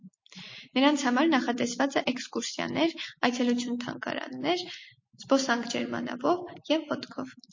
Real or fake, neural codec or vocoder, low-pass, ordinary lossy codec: real; none; 7.2 kHz; MP3, 32 kbps